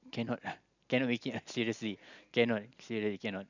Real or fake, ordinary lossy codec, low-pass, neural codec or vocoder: real; none; 7.2 kHz; none